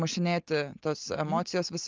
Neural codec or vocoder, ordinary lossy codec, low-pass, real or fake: none; Opus, 24 kbps; 7.2 kHz; real